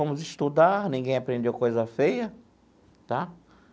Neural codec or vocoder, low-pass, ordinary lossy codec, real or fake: none; none; none; real